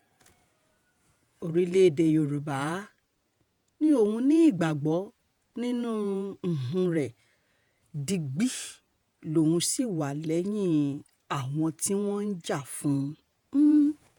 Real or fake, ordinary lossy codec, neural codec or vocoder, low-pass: fake; none; vocoder, 48 kHz, 128 mel bands, Vocos; 19.8 kHz